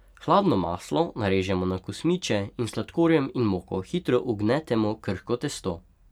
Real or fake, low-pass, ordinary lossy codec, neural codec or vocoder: real; 19.8 kHz; none; none